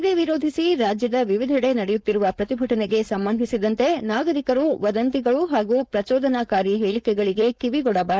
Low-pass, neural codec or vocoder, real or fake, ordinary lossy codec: none; codec, 16 kHz, 4.8 kbps, FACodec; fake; none